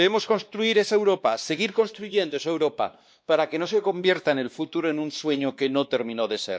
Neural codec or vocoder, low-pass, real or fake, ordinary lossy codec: codec, 16 kHz, 2 kbps, X-Codec, WavLM features, trained on Multilingual LibriSpeech; none; fake; none